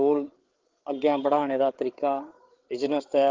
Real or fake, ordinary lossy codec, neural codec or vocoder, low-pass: fake; Opus, 16 kbps; codec, 16 kHz, 8 kbps, FreqCodec, larger model; 7.2 kHz